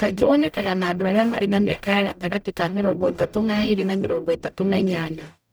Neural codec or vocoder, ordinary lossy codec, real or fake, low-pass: codec, 44.1 kHz, 0.9 kbps, DAC; none; fake; none